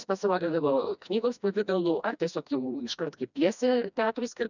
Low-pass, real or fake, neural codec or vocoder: 7.2 kHz; fake; codec, 16 kHz, 1 kbps, FreqCodec, smaller model